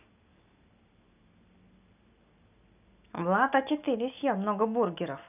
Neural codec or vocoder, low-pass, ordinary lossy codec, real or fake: none; 3.6 kHz; none; real